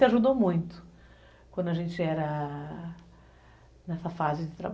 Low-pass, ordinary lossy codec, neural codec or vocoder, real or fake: none; none; none; real